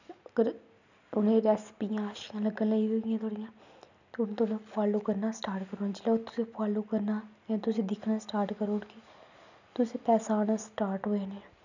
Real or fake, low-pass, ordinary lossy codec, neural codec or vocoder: real; 7.2 kHz; none; none